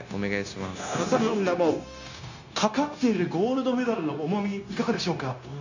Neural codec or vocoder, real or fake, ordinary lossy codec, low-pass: codec, 16 kHz, 0.9 kbps, LongCat-Audio-Codec; fake; none; 7.2 kHz